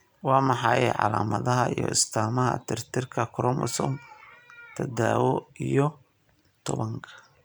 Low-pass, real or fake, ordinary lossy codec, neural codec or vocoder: none; real; none; none